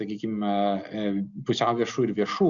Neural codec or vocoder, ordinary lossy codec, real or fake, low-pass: none; Opus, 64 kbps; real; 7.2 kHz